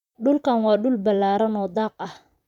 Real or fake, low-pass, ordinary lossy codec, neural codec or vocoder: fake; 19.8 kHz; none; vocoder, 44.1 kHz, 128 mel bands, Pupu-Vocoder